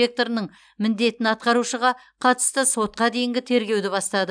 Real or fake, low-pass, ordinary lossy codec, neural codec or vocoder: real; 9.9 kHz; none; none